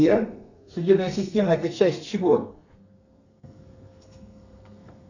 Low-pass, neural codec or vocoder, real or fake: 7.2 kHz; codec, 32 kHz, 1.9 kbps, SNAC; fake